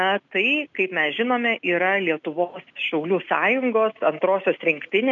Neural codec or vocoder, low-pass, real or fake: none; 7.2 kHz; real